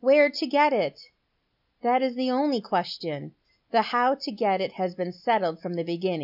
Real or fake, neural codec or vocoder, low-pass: real; none; 5.4 kHz